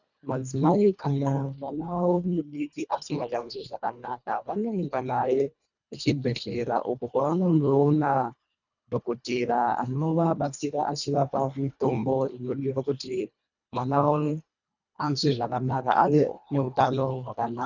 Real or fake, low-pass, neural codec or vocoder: fake; 7.2 kHz; codec, 24 kHz, 1.5 kbps, HILCodec